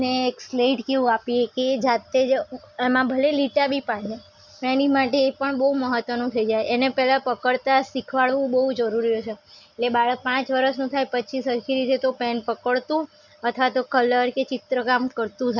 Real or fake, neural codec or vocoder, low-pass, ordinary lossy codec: real; none; 7.2 kHz; none